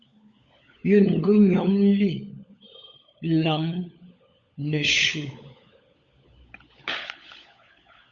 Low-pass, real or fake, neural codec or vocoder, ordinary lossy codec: 7.2 kHz; fake; codec, 16 kHz, 16 kbps, FunCodec, trained on LibriTTS, 50 frames a second; Opus, 64 kbps